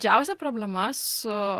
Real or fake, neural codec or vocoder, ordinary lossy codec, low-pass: fake; vocoder, 48 kHz, 128 mel bands, Vocos; Opus, 24 kbps; 14.4 kHz